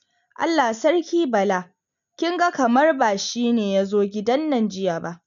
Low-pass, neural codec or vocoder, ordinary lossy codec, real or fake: 7.2 kHz; none; none; real